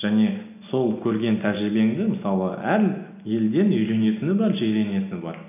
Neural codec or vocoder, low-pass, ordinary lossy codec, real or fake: none; 3.6 kHz; none; real